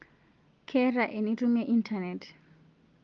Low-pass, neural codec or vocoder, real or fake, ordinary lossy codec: 7.2 kHz; none; real; Opus, 24 kbps